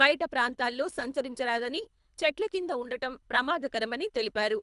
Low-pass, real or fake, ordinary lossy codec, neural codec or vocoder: 10.8 kHz; fake; none; codec, 24 kHz, 3 kbps, HILCodec